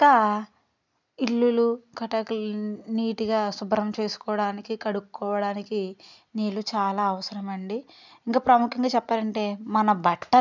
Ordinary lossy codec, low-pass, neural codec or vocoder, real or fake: none; 7.2 kHz; none; real